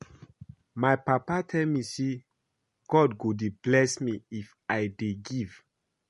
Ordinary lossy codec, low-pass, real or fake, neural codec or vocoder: MP3, 48 kbps; 14.4 kHz; real; none